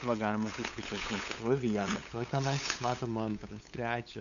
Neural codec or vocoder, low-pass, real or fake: codec, 16 kHz, 8 kbps, FunCodec, trained on LibriTTS, 25 frames a second; 7.2 kHz; fake